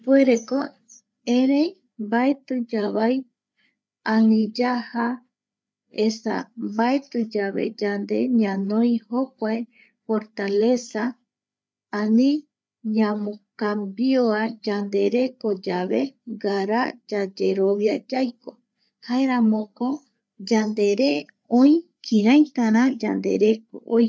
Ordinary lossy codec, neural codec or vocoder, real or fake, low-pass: none; codec, 16 kHz, 8 kbps, FreqCodec, larger model; fake; none